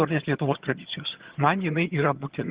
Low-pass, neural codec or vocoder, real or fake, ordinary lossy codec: 3.6 kHz; vocoder, 22.05 kHz, 80 mel bands, HiFi-GAN; fake; Opus, 16 kbps